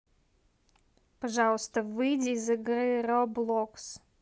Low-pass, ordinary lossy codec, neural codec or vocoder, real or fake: none; none; none; real